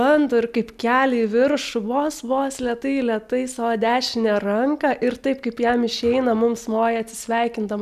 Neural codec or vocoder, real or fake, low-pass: none; real; 14.4 kHz